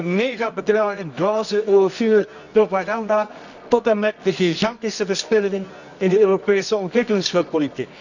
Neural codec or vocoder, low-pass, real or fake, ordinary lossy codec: codec, 24 kHz, 0.9 kbps, WavTokenizer, medium music audio release; 7.2 kHz; fake; none